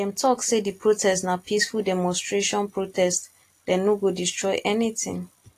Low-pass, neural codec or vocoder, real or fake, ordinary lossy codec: 14.4 kHz; none; real; AAC, 48 kbps